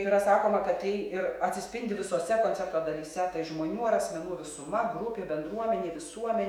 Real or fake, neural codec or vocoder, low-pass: fake; autoencoder, 48 kHz, 128 numbers a frame, DAC-VAE, trained on Japanese speech; 19.8 kHz